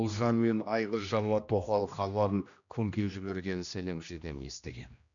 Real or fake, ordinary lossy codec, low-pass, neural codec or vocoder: fake; none; 7.2 kHz; codec, 16 kHz, 1 kbps, X-Codec, HuBERT features, trained on general audio